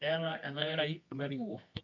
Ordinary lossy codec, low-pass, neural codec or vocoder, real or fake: MP3, 48 kbps; 7.2 kHz; codec, 24 kHz, 0.9 kbps, WavTokenizer, medium music audio release; fake